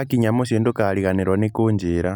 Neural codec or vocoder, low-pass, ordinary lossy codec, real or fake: none; 19.8 kHz; none; real